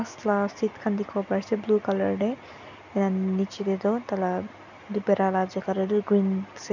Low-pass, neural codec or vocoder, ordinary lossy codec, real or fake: 7.2 kHz; none; none; real